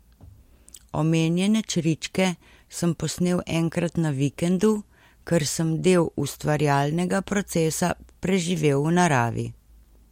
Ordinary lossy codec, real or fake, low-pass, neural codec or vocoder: MP3, 64 kbps; real; 19.8 kHz; none